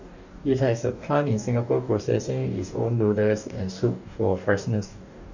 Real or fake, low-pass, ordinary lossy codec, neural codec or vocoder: fake; 7.2 kHz; none; codec, 44.1 kHz, 2.6 kbps, DAC